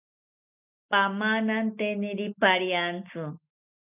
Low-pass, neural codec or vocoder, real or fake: 3.6 kHz; none; real